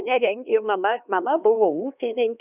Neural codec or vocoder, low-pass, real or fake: codec, 16 kHz, 2 kbps, X-Codec, HuBERT features, trained on LibriSpeech; 3.6 kHz; fake